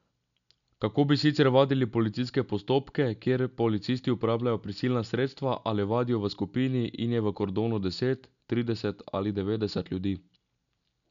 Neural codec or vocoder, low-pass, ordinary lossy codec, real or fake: none; 7.2 kHz; none; real